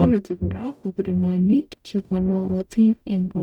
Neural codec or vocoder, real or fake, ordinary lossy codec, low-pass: codec, 44.1 kHz, 0.9 kbps, DAC; fake; none; 19.8 kHz